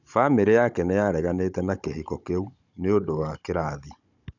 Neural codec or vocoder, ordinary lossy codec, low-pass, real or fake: codec, 16 kHz, 16 kbps, FunCodec, trained on Chinese and English, 50 frames a second; none; 7.2 kHz; fake